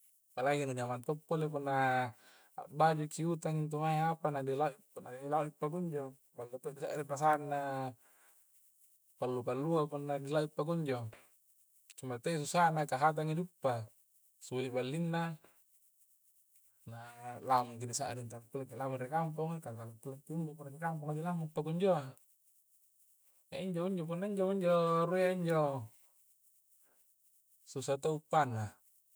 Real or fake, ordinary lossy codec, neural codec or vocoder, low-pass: fake; none; autoencoder, 48 kHz, 128 numbers a frame, DAC-VAE, trained on Japanese speech; none